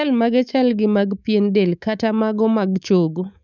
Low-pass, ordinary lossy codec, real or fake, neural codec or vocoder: 7.2 kHz; none; fake; codec, 24 kHz, 3.1 kbps, DualCodec